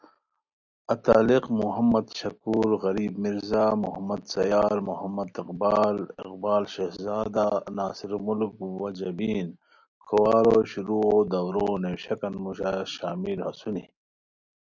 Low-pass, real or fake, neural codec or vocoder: 7.2 kHz; real; none